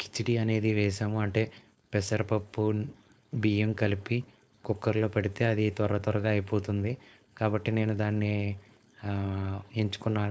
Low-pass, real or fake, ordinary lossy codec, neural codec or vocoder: none; fake; none; codec, 16 kHz, 4.8 kbps, FACodec